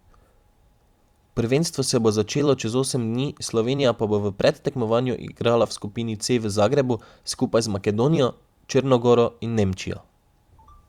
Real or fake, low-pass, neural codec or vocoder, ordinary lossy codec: fake; 19.8 kHz; vocoder, 44.1 kHz, 128 mel bands every 256 samples, BigVGAN v2; Opus, 64 kbps